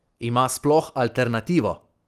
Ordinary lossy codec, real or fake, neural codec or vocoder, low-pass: Opus, 24 kbps; real; none; 14.4 kHz